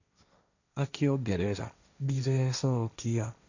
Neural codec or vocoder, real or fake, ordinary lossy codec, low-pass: codec, 16 kHz, 1.1 kbps, Voila-Tokenizer; fake; none; 7.2 kHz